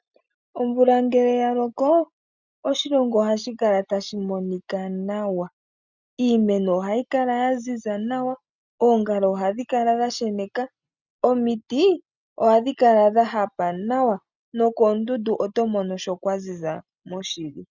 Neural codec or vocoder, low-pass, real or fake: none; 7.2 kHz; real